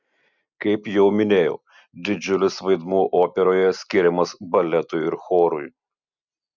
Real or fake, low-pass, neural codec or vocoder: real; 7.2 kHz; none